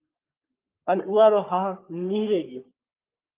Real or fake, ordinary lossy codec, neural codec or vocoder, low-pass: fake; Opus, 24 kbps; codec, 44.1 kHz, 3.4 kbps, Pupu-Codec; 3.6 kHz